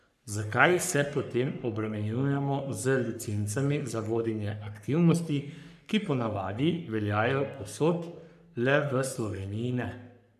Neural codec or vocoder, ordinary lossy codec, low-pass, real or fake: codec, 44.1 kHz, 3.4 kbps, Pupu-Codec; none; 14.4 kHz; fake